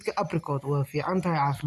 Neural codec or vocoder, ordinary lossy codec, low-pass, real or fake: none; none; 14.4 kHz; real